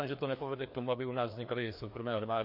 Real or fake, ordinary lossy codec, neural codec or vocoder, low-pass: fake; MP3, 32 kbps; codec, 16 kHz, 2 kbps, FreqCodec, larger model; 5.4 kHz